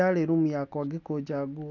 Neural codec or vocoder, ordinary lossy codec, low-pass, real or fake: none; none; 7.2 kHz; real